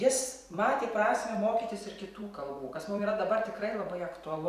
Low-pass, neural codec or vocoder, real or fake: 14.4 kHz; vocoder, 44.1 kHz, 128 mel bands every 512 samples, BigVGAN v2; fake